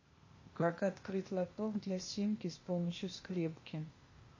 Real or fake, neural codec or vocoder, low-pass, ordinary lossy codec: fake; codec, 16 kHz, 0.8 kbps, ZipCodec; 7.2 kHz; MP3, 32 kbps